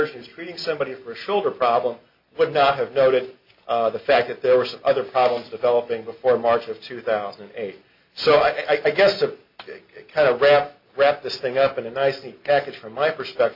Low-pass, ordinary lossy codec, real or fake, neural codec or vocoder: 5.4 kHz; MP3, 48 kbps; real; none